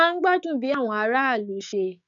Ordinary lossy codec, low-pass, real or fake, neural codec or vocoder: none; 7.2 kHz; fake; codec, 16 kHz, 6 kbps, DAC